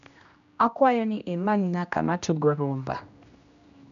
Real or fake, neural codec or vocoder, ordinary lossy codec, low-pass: fake; codec, 16 kHz, 1 kbps, X-Codec, HuBERT features, trained on general audio; none; 7.2 kHz